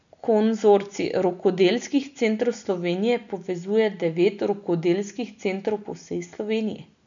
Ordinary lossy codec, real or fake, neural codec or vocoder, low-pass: none; real; none; 7.2 kHz